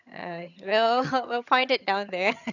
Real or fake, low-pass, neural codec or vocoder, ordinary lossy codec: fake; 7.2 kHz; vocoder, 22.05 kHz, 80 mel bands, HiFi-GAN; none